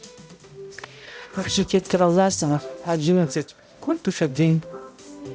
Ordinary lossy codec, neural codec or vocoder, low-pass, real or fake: none; codec, 16 kHz, 0.5 kbps, X-Codec, HuBERT features, trained on balanced general audio; none; fake